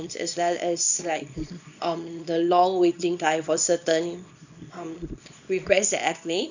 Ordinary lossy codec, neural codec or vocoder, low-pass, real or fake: none; codec, 24 kHz, 0.9 kbps, WavTokenizer, small release; 7.2 kHz; fake